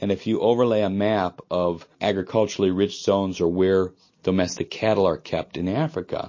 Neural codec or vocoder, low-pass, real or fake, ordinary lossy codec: none; 7.2 kHz; real; MP3, 32 kbps